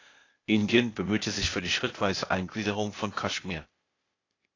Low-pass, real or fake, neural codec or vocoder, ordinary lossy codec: 7.2 kHz; fake; codec, 16 kHz, 0.8 kbps, ZipCodec; AAC, 32 kbps